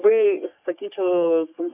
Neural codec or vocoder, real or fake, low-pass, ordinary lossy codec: codec, 44.1 kHz, 3.4 kbps, Pupu-Codec; fake; 3.6 kHz; AAC, 24 kbps